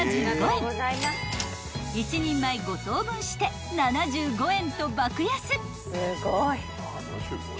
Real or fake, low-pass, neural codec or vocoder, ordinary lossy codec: real; none; none; none